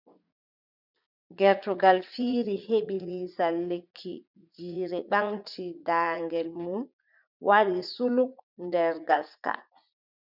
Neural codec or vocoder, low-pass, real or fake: vocoder, 44.1 kHz, 80 mel bands, Vocos; 5.4 kHz; fake